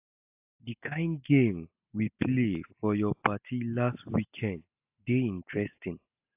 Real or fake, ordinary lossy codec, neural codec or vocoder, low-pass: real; AAC, 32 kbps; none; 3.6 kHz